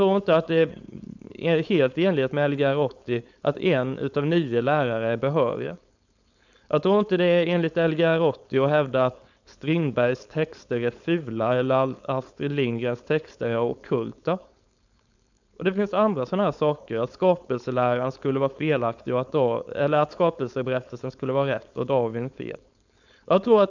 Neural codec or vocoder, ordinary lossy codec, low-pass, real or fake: codec, 16 kHz, 4.8 kbps, FACodec; none; 7.2 kHz; fake